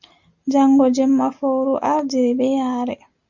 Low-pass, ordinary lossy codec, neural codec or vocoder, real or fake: 7.2 kHz; Opus, 64 kbps; none; real